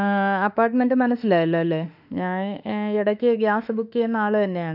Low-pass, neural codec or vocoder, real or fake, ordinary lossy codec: 5.4 kHz; autoencoder, 48 kHz, 32 numbers a frame, DAC-VAE, trained on Japanese speech; fake; none